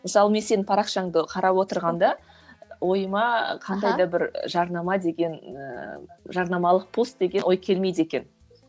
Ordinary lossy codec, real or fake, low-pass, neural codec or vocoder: none; real; none; none